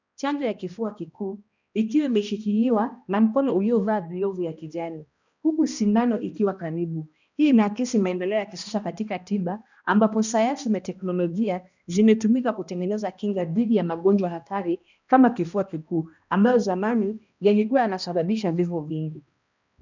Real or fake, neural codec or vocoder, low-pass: fake; codec, 16 kHz, 1 kbps, X-Codec, HuBERT features, trained on balanced general audio; 7.2 kHz